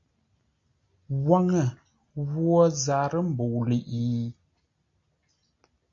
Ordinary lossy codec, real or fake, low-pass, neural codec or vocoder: MP3, 48 kbps; real; 7.2 kHz; none